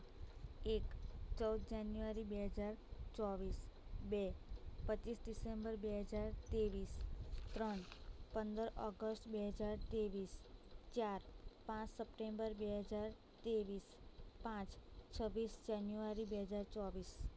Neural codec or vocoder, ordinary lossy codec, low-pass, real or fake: none; none; none; real